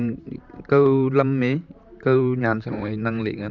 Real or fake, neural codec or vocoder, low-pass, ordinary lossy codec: fake; vocoder, 44.1 kHz, 128 mel bands, Pupu-Vocoder; 7.2 kHz; none